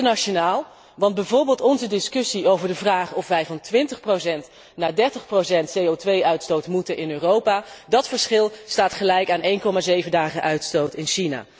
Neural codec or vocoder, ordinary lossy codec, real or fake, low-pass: none; none; real; none